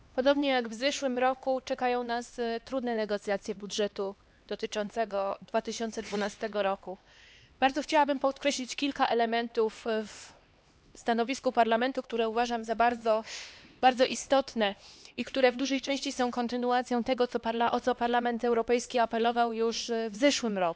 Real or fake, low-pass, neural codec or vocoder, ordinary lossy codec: fake; none; codec, 16 kHz, 2 kbps, X-Codec, HuBERT features, trained on LibriSpeech; none